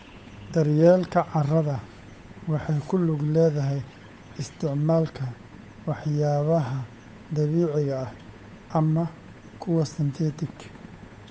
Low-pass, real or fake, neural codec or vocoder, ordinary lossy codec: none; fake; codec, 16 kHz, 8 kbps, FunCodec, trained on Chinese and English, 25 frames a second; none